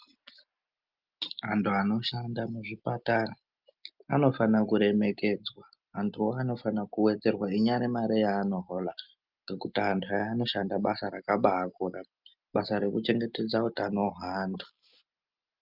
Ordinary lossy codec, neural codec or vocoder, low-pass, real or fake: Opus, 24 kbps; none; 5.4 kHz; real